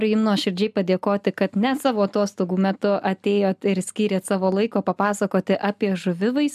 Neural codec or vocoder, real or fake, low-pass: none; real; 14.4 kHz